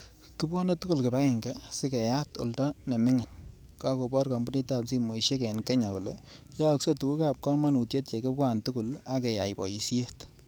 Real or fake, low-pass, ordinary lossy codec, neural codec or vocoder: fake; none; none; codec, 44.1 kHz, 7.8 kbps, DAC